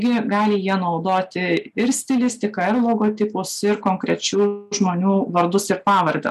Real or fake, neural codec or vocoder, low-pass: real; none; 14.4 kHz